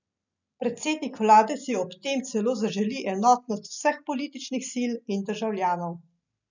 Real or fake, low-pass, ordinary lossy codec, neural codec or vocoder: real; 7.2 kHz; none; none